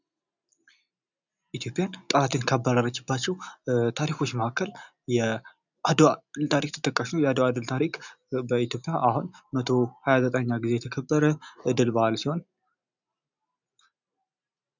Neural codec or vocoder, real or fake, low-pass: none; real; 7.2 kHz